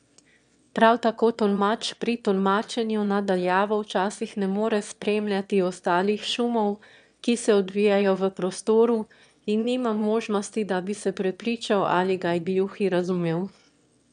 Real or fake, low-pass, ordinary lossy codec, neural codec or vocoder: fake; 9.9 kHz; MP3, 96 kbps; autoencoder, 22.05 kHz, a latent of 192 numbers a frame, VITS, trained on one speaker